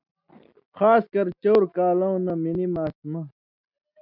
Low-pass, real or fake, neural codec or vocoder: 5.4 kHz; real; none